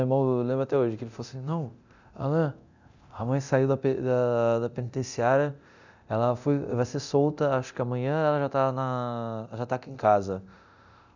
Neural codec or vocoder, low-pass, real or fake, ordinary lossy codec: codec, 24 kHz, 0.9 kbps, DualCodec; 7.2 kHz; fake; none